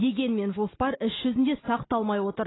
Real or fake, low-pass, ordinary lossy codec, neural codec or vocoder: real; 7.2 kHz; AAC, 16 kbps; none